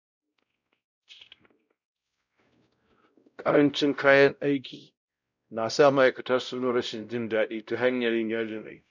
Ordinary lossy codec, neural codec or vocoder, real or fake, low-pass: none; codec, 16 kHz, 0.5 kbps, X-Codec, WavLM features, trained on Multilingual LibriSpeech; fake; 7.2 kHz